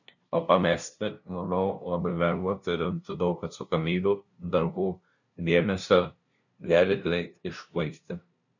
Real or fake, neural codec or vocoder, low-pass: fake; codec, 16 kHz, 0.5 kbps, FunCodec, trained on LibriTTS, 25 frames a second; 7.2 kHz